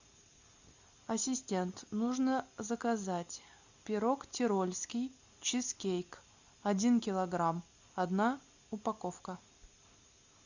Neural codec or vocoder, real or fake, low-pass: none; real; 7.2 kHz